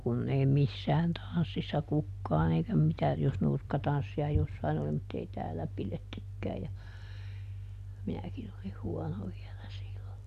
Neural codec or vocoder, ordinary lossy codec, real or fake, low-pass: none; none; real; 14.4 kHz